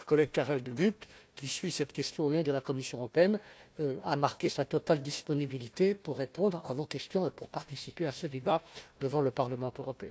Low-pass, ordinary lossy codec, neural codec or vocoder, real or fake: none; none; codec, 16 kHz, 1 kbps, FunCodec, trained on Chinese and English, 50 frames a second; fake